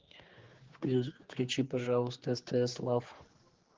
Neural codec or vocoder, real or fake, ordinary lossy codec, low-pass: codec, 16 kHz, 4 kbps, X-Codec, HuBERT features, trained on general audio; fake; Opus, 16 kbps; 7.2 kHz